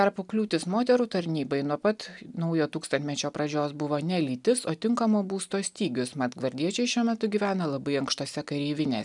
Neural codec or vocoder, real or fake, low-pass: none; real; 10.8 kHz